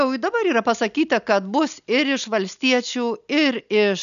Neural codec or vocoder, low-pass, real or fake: none; 7.2 kHz; real